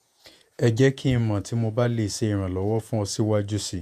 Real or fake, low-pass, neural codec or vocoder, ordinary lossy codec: fake; 14.4 kHz; vocoder, 48 kHz, 128 mel bands, Vocos; none